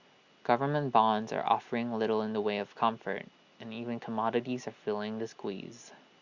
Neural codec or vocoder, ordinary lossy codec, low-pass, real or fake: none; Opus, 64 kbps; 7.2 kHz; real